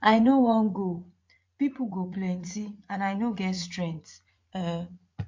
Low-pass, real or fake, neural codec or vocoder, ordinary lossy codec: 7.2 kHz; fake; vocoder, 44.1 kHz, 80 mel bands, Vocos; MP3, 48 kbps